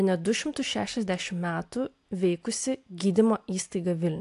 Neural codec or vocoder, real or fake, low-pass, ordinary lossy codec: none; real; 10.8 kHz; AAC, 64 kbps